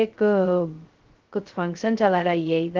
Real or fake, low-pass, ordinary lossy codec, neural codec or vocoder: fake; 7.2 kHz; Opus, 16 kbps; codec, 16 kHz, 0.2 kbps, FocalCodec